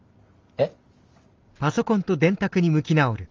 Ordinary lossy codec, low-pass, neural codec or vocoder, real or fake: Opus, 32 kbps; 7.2 kHz; none; real